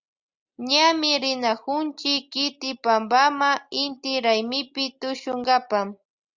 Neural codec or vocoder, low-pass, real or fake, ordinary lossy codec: none; 7.2 kHz; real; Opus, 64 kbps